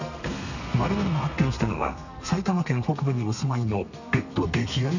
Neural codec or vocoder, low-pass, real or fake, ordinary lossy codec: codec, 32 kHz, 1.9 kbps, SNAC; 7.2 kHz; fake; none